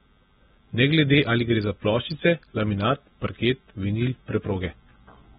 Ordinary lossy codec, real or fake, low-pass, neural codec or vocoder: AAC, 16 kbps; real; 19.8 kHz; none